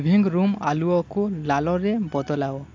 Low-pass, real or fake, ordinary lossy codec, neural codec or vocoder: 7.2 kHz; real; AAC, 48 kbps; none